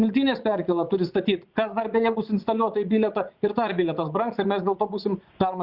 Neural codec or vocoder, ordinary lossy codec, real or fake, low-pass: vocoder, 44.1 kHz, 80 mel bands, Vocos; Opus, 64 kbps; fake; 5.4 kHz